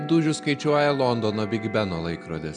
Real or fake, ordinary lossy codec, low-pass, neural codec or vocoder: real; MP3, 96 kbps; 9.9 kHz; none